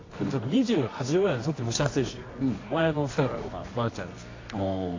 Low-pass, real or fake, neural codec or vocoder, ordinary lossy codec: 7.2 kHz; fake; codec, 24 kHz, 0.9 kbps, WavTokenizer, medium music audio release; AAC, 32 kbps